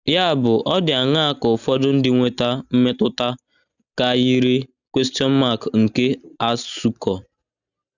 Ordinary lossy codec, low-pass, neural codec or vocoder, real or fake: none; 7.2 kHz; none; real